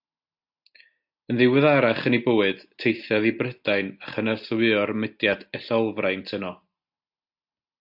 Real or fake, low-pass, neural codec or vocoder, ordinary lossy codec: real; 5.4 kHz; none; AAC, 48 kbps